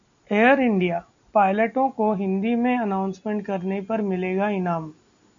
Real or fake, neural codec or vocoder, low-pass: real; none; 7.2 kHz